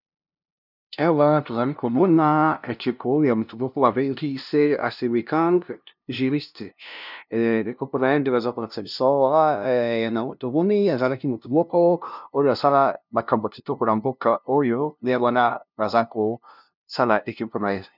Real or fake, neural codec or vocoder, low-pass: fake; codec, 16 kHz, 0.5 kbps, FunCodec, trained on LibriTTS, 25 frames a second; 5.4 kHz